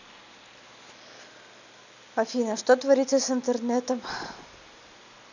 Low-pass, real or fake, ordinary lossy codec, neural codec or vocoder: 7.2 kHz; real; none; none